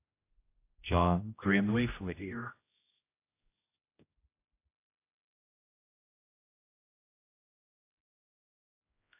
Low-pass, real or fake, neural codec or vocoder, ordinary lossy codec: 3.6 kHz; fake; codec, 16 kHz, 0.5 kbps, X-Codec, HuBERT features, trained on general audio; AAC, 24 kbps